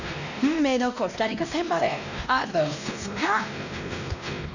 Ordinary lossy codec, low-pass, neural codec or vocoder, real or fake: none; 7.2 kHz; codec, 16 kHz, 1 kbps, X-Codec, WavLM features, trained on Multilingual LibriSpeech; fake